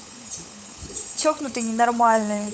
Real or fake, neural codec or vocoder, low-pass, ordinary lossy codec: fake; codec, 16 kHz, 16 kbps, FunCodec, trained on LibriTTS, 50 frames a second; none; none